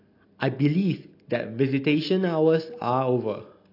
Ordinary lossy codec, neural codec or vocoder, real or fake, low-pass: AAC, 32 kbps; none; real; 5.4 kHz